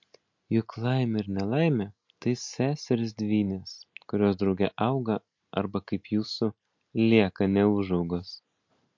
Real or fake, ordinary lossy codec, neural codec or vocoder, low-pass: real; MP3, 48 kbps; none; 7.2 kHz